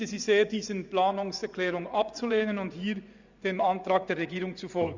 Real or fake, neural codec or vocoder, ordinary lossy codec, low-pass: fake; vocoder, 24 kHz, 100 mel bands, Vocos; Opus, 64 kbps; 7.2 kHz